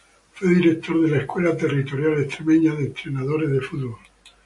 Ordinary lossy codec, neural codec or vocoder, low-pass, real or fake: MP3, 48 kbps; none; 10.8 kHz; real